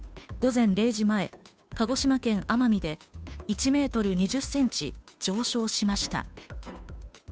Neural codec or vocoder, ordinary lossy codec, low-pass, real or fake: codec, 16 kHz, 2 kbps, FunCodec, trained on Chinese and English, 25 frames a second; none; none; fake